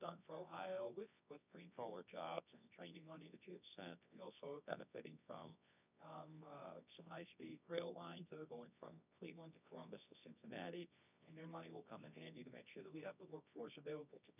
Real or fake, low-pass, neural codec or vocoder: fake; 3.6 kHz; codec, 24 kHz, 0.9 kbps, WavTokenizer, medium music audio release